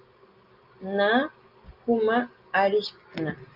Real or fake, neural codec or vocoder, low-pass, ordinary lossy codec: real; none; 5.4 kHz; Opus, 24 kbps